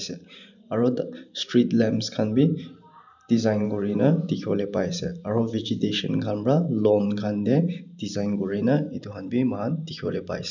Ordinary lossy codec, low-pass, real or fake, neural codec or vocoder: none; 7.2 kHz; fake; vocoder, 44.1 kHz, 128 mel bands every 512 samples, BigVGAN v2